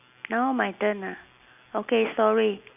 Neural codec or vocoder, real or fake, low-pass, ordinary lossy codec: none; real; 3.6 kHz; none